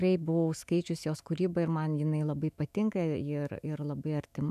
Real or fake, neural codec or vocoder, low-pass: fake; autoencoder, 48 kHz, 128 numbers a frame, DAC-VAE, trained on Japanese speech; 14.4 kHz